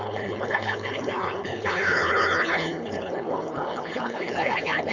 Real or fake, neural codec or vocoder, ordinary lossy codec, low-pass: fake; codec, 16 kHz, 4.8 kbps, FACodec; none; 7.2 kHz